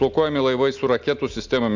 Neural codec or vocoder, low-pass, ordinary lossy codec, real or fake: none; 7.2 kHz; Opus, 64 kbps; real